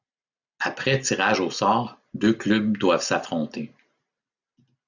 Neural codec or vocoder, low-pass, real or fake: none; 7.2 kHz; real